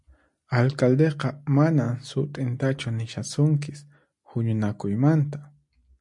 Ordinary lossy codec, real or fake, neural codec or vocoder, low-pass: MP3, 64 kbps; real; none; 10.8 kHz